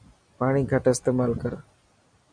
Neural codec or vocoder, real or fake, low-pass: none; real; 9.9 kHz